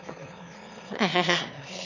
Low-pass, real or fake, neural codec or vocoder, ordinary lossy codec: 7.2 kHz; fake; autoencoder, 22.05 kHz, a latent of 192 numbers a frame, VITS, trained on one speaker; none